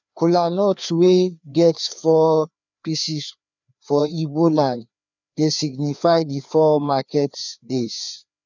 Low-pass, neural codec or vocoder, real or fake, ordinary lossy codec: 7.2 kHz; codec, 16 kHz, 2 kbps, FreqCodec, larger model; fake; none